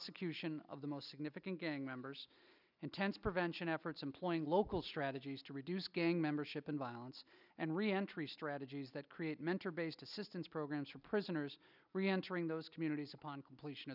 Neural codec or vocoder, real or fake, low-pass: none; real; 5.4 kHz